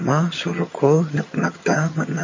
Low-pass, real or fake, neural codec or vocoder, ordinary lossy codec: 7.2 kHz; fake; vocoder, 22.05 kHz, 80 mel bands, HiFi-GAN; MP3, 32 kbps